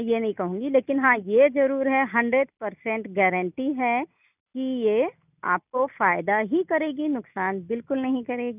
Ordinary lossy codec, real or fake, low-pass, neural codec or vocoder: none; real; 3.6 kHz; none